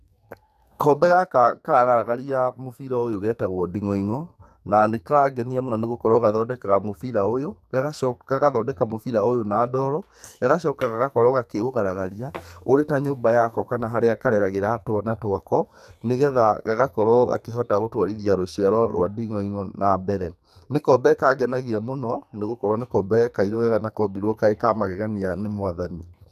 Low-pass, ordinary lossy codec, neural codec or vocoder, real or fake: 14.4 kHz; none; codec, 44.1 kHz, 2.6 kbps, SNAC; fake